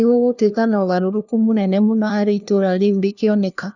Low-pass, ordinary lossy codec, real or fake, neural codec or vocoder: 7.2 kHz; none; fake; codec, 16 kHz, 1 kbps, FunCodec, trained on LibriTTS, 50 frames a second